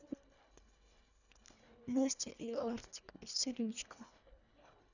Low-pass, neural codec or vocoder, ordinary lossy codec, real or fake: 7.2 kHz; codec, 24 kHz, 1.5 kbps, HILCodec; none; fake